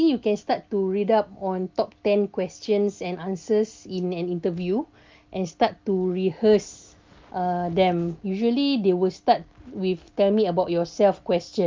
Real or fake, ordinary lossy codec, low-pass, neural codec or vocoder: real; Opus, 24 kbps; 7.2 kHz; none